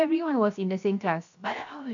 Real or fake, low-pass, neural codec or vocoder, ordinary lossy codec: fake; 7.2 kHz; codec, 16 kHz, 0.7 kbps, FocalCodec; none